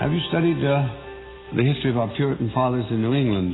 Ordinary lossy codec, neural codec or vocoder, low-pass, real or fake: AAC, 16 kbps; none; 7.2 kHz; real